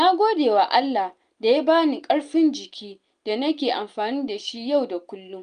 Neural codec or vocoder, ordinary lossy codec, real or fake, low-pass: none; Opus, 32 kbps; real; 9.9 kHz